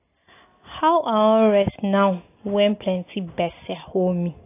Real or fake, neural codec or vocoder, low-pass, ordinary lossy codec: real; none; 3.6 kHz; AAC, 24 kbps